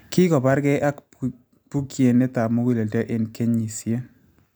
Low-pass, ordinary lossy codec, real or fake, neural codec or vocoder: none; none; real; none